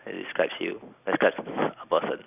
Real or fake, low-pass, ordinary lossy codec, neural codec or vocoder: real; 3.6 kHz; none; none